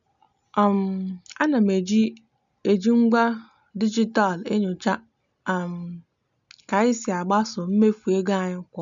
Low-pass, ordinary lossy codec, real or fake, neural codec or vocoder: 7.2 kHz; none; real; none